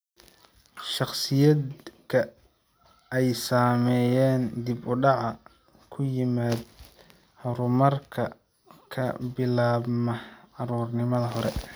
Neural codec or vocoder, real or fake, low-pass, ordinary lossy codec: none; real; none; none